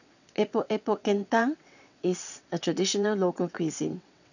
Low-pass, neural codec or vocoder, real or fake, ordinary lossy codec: 7.2 kHz; vocoder, 22.05 kHz, 80 mel bands, WaveNeXt; fake; none